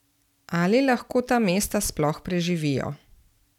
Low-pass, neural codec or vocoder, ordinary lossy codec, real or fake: 19.8 kHz; none; none; real